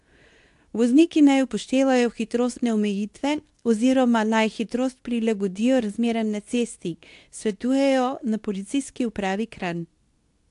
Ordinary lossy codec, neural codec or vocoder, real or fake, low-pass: AAC, 64 kbps; codec, 24 kHz, 0.9 kbps, WavTokenizer, medium speech release version 2; fake; 10.8 kHz